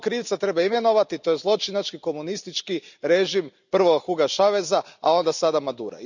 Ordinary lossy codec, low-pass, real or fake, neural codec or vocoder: none; 7.2 kHz; real; none